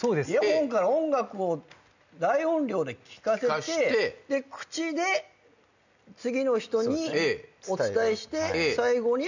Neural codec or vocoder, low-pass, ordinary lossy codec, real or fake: none; 7.2 kHz; none; real